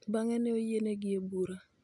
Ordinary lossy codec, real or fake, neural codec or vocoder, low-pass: none; real; none; 10.8 kHz